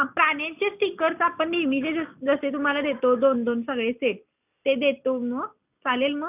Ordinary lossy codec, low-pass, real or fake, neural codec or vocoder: none; 3.6 kHz; real; none